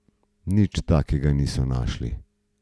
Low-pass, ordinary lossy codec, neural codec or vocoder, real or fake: none; none; none; real